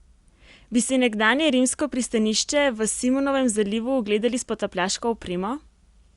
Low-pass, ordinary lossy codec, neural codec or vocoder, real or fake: 10.8 kHz; Opus, 64 kbps; none; real